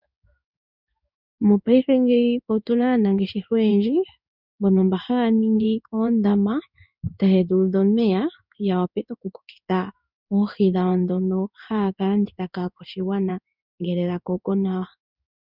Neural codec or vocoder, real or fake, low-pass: codec, 16 kHz in and 24 kHz out, 1 kbps, XY-Tokenizer; fake; 5.4 kHz